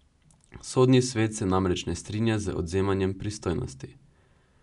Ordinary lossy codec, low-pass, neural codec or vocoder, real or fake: none; 10.8 kHz; none; real